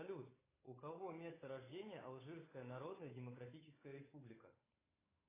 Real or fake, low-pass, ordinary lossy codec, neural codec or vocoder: fake; 3.6 kHz; AAC, 16 kbps; codec, 16 kHz, 8 kbps, FunCodec, trained on Chinese and English, 25 frames a second